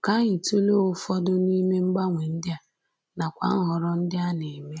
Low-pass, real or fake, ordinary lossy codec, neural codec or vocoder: none; real; none; none